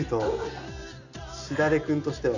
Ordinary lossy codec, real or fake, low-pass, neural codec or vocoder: none; real; 7.2 kHz; none